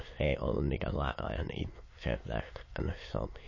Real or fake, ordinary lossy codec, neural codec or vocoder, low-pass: fake; MP3, 32 kbps; autoencoder, 22.05 kHz, a latent of 192 numbers a frame, VITS, trained on many speakers; 7.2 kHz